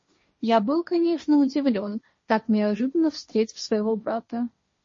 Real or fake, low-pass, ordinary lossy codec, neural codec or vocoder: fake; 7.2 kHz; MP3, 32 kbps; codec, 16 kHz, 1.1 kbps, Voila-Tokenizer